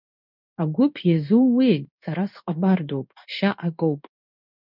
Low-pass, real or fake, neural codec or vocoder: 5.4 kHz; fake; codec, 16 kHz in and 24 kHz out, 1 kbps, XY-Tokenizer